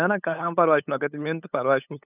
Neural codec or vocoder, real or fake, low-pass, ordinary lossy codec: codec, 16 kHz, 8 kbps, FunCodec, trained on LibriTTS, 25 frames a second; fake; 3.6 kHz; none